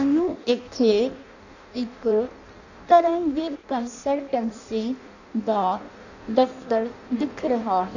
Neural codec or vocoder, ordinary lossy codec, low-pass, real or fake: codec, 16 kHz in and 24 kHz out, 0.6 kbps, FireRedTTS-2 codec; none; 7.2 kHz; fake